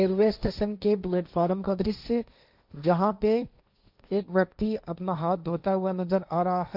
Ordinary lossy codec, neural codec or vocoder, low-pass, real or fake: none; codec, 16 kHz, 1.1 kbps, Voila-Tokenizer; 5.4 kHz; fake